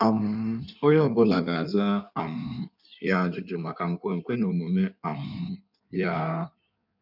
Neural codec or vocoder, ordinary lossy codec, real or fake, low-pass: codec, 16 kHz in and 24 kHz out, 1.1 kbps, FireRedTTS-2 codec; none; fake; 5.4 kHz